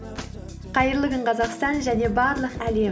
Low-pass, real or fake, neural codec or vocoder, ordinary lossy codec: none; real; none; none